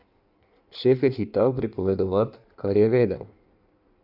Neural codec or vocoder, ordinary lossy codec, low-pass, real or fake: codec, 16 kHz in and 24 kHz out, 1.1 kbps, FireRedTTS-2 codec; none; 5.4 kHz; fake